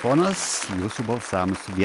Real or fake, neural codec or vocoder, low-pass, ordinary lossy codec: real; none; 10.8 kHz; Opus, 32 kbps